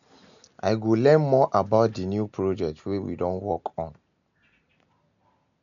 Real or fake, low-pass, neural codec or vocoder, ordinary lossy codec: real; 7.2 kHz; none; none